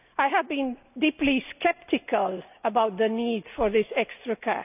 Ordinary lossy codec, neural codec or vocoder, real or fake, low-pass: none; none; real; 3.6 kHz